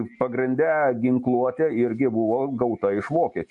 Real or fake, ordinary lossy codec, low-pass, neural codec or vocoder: real; MP3, 48 kbps; 10.8 kHz; none